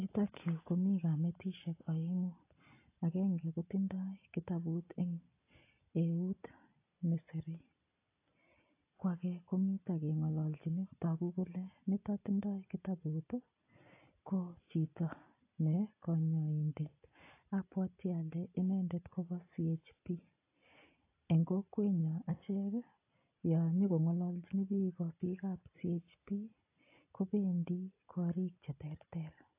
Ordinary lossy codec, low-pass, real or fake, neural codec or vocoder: AAC, 24 kbps; 3.6 kHz; real; none